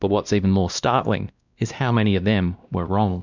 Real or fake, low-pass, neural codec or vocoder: fake; 7.2 kHz; codec, 16 kHz, 1 kbps, X-Codec, WavLM features, trained on Multilingual LibriSpeech